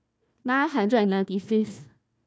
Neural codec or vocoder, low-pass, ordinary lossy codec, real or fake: codec, 16 kHz, 1 kbps, FunCodec, trained on Chinese and English, 50 frames a second; none; none; fake